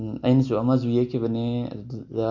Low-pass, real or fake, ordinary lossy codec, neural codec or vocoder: 7.2 kHz; real; none; none